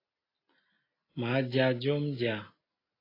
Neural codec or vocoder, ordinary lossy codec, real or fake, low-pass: none; AAC, 24 kbps; real; 5.4 kHz